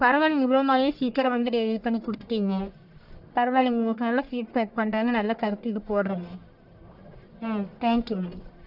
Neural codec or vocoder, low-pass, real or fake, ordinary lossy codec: codec, 44.1 kHz, 1.7 kbps, Pupu-Codec; 5.4 kHz; fake; none